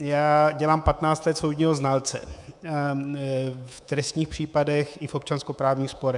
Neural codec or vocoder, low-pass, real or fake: codec, 24 kHz, 3.1 kbps, DualCodec; 10.8 kHz; fake